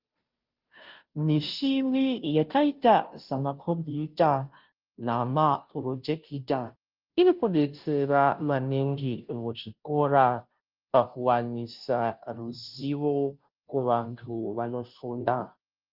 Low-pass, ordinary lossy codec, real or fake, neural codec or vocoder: 5.4 kHz; Opus, 24 kbps; fake; codec, 16 kHz, 0.5 kbps, FunCodec, trained on Chinese and English, 25 frames a second